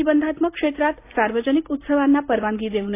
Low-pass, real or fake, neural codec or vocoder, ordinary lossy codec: 3.6 kHz; real; none; AAC, 24 kbps